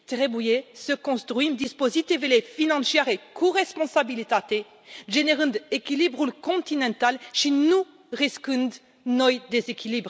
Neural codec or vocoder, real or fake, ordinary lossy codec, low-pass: none; real; none; none